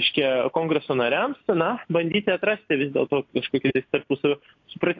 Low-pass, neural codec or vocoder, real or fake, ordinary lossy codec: 7.2 kHz; none; real; AAC, 48 kbps